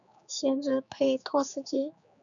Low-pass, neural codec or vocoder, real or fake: 7.2 kHz; codec, 16 kHz, 4 kbps, X-Codec, HuBERT features, trained on general audio; fake